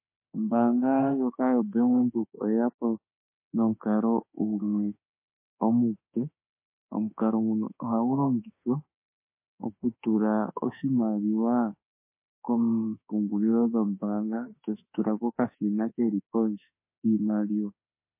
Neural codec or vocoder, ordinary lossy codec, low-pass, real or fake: autoencoder, 48 kHz, 32 numbers a frame, DAC-VAE, trained on Japanese speech; MP3, 24 kbps; 3.6 kHz; fake